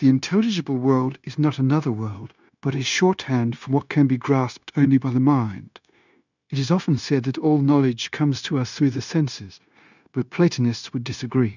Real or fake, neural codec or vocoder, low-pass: fake; codec, 16 kHz, 0.9 kbps, LongCat-Audio-Codec; 7.2 kHz